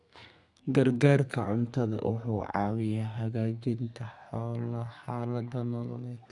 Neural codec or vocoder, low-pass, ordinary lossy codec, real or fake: codec, 32 kHz, 1.9 kbps, SNAC; 10.8 kHz; none; fake